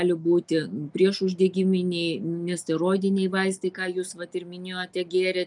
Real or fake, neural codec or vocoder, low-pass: real; none; 10.8 kHz